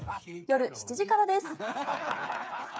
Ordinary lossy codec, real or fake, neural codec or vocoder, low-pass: none; fake; codec, 16 kHz, 8 kbps, FreqCodec, smaller model; none